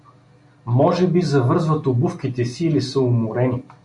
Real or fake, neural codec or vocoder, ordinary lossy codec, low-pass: real; none; MP3, 48 kbps; 10.8 kHz